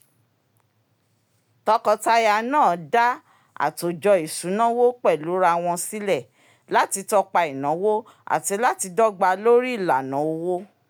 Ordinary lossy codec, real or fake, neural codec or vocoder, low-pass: none; real; none; none